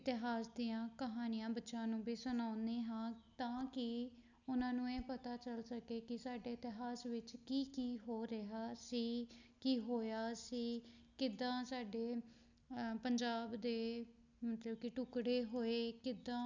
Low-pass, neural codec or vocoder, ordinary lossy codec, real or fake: 7.2 kHz; none; none; real